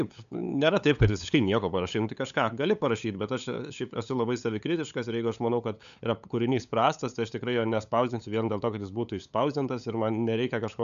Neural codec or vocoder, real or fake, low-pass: codec, 16 kHz, 8 kbps, FunCodec, trained on LibriTTS, 25 frames a second; fake; 7.2 kHz